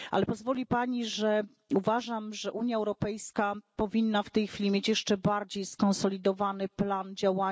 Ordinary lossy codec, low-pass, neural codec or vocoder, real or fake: none; none; none; real